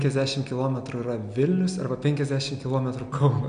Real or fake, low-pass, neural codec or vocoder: real; 9.9 kHz; none